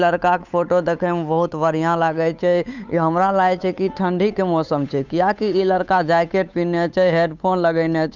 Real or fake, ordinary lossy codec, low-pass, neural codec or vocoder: fake; none; 7.2 kHz; codec, 16 kHz, 8 kbps, FunCodec, trained on Chinese and English, 25 frames a second